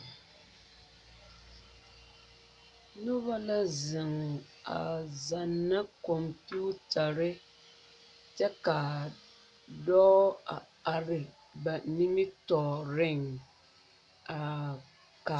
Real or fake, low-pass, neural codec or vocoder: real; 10.8 kHz; none